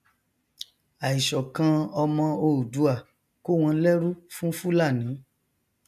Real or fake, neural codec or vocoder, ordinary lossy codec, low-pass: real; none; none; 14.4 kHz